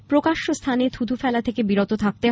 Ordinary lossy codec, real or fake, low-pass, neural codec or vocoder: none; real; none; none